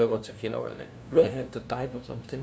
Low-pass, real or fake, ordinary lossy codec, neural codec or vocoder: none; fake; none; codec, 16 kHz, 0.5 kbps, FunCodec, trained on LibriTTS, 25 frames a second